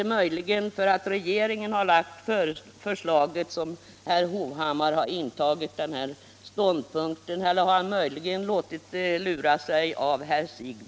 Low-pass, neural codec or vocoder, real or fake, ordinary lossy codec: none; none; real; none